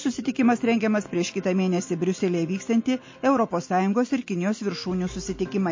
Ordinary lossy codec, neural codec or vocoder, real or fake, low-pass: MP3, 32 kbps; none; real; 7.2 kHz